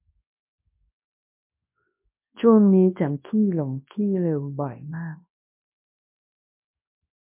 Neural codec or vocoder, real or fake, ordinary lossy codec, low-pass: codec, 24 kHz, 0.9 kbps, WavTokenizer, large speech release; fake; MP3, 32 kbps; 3.6 kHz